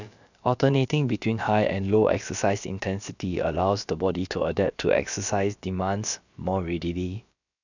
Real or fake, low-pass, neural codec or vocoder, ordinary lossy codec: fake; 7.2 kHz; codec, 16 kHz, about 1 kbps, DyCAST, with the encoder's durations; none